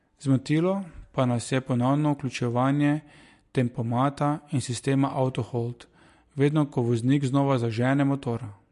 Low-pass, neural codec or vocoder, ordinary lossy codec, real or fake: 14.4 kHz; none; MP3, 48 kbps; real